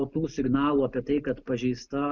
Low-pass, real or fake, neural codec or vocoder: 7.2 kHz; real; none